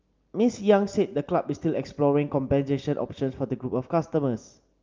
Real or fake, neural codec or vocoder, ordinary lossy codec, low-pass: real; none; Opus, 24 kbps; 7.2 kHz